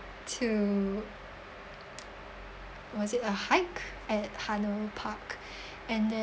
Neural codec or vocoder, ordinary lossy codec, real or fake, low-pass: none; none; real; none